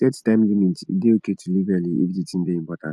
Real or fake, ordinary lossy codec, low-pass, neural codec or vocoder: real; none; none; none